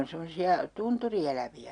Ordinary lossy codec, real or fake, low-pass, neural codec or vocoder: none; real; 9.9 kHz; none